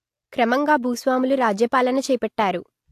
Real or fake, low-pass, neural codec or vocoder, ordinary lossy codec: real; 14.4 kHz; none; AAC, 64 kbps